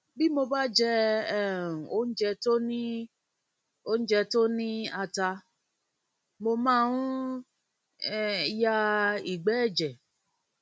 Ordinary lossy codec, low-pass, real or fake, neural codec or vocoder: none; none; real; none